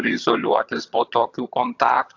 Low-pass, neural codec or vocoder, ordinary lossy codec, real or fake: 7.2 kHz; vocoder, 22.05 kHz, 80 mel bands, HiFi-GAN; AAC, 48 kbps; fake